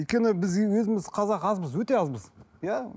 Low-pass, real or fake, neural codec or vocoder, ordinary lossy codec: none; real; none; none